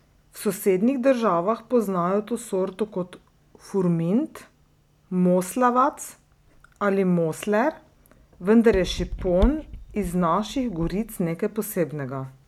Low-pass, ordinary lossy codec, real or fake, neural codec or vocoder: 19.8 kHz; none; real; none